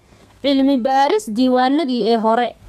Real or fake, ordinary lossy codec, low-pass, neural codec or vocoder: fake; none; 14.4 kHz; codec, 32 kHz, 1.9 kbps, SNAC